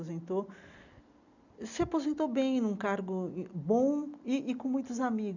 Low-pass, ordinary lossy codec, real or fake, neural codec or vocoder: 7.2 kHz; none; real; none